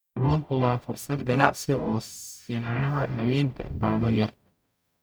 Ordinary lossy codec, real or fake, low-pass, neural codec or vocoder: none; fake; none; codec, 44.1 kHz, 0.9 kbps, DAC